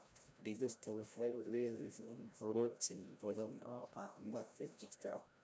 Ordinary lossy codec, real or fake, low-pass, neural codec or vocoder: none; fake; none; codec, 16 kHz, 0.5 kbps, FreqCodec, larger model